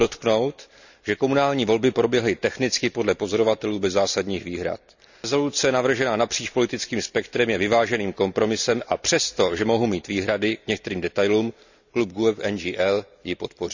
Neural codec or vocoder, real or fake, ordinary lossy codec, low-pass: none; real; none; 7.2 kHz